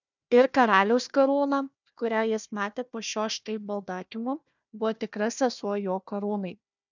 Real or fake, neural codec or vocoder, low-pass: fake; codec, 16 kHz, 1 kbps, FunCodec, trained on Chinese and English, 50 frames a second; 7.2 kHz